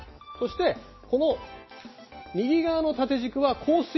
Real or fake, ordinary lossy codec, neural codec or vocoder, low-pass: real; MP3, 24 kbps; none; 7.2 kHz